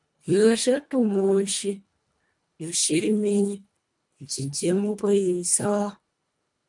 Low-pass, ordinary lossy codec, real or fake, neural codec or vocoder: 10.8 kHz; MP3, 96 kbps; fake; codec, 24 kHz, 1.5 kbps, HILCodec